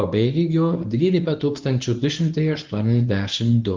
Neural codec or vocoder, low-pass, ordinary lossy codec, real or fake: codec, 24 kHz, 0.9 kbps, WavTokenizer, medium speech release version 1; 7.2 kHz; Opus, 32 kbps; fake